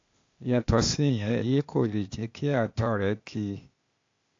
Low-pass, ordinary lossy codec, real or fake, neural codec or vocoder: 7.2 kHz; AAC, 48 kbps; fake; codec, 16 kHz, 0.8 kbps, ZipCodec